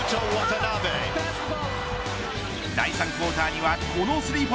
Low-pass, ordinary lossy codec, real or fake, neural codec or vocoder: none; none; real; none